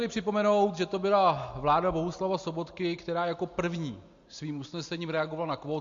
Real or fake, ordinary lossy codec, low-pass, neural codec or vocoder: real; MP3, 48 kbps; 7.2 kHz; none